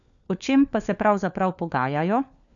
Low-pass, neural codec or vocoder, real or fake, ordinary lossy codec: 7.2 kHz; codec, 16 kHz, 4 kbps, FunCodec, trained on LibriTTS, 50 frames a second; fake; none